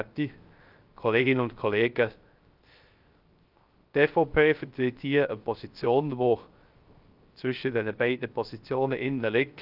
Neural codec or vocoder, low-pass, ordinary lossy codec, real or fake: codec, 16 kHz, 0.3 kbps, FocalCodec; 5.4 kHz; Opus, 24 kbps; fake